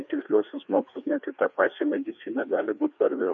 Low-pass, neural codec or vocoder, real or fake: 7.2 kHz; codec, 16 kHz, 2 kbps, FreqCodec, larger model; fake